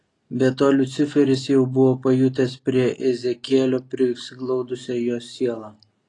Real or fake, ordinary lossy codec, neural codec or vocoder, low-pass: real; AAC, 32 kbps; none; 10.8 kHz